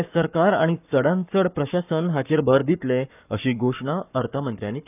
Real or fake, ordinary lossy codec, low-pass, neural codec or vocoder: fake; none; 3.6 kHz; codec, 44.1 kHz, 7.8 kbps, Pupu-Codec